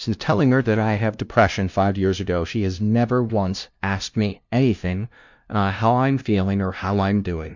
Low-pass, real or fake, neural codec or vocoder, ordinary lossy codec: 7.2 kHz; fake; codec, 16 kHz, 0.5 kbps, FunCodec, trained on LibriTTS, 25 frames a second; MP3, 64 kbps